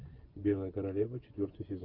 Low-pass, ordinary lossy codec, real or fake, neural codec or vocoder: 5.4 kHz; Opus, 24 kbps; fake; vocoder, 24 kHz, 100 mel bands, Vocos